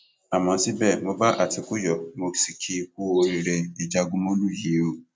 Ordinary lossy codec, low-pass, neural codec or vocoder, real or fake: none; none; none; real